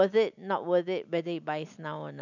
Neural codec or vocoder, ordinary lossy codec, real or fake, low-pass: none; none; real; 7.2 kHz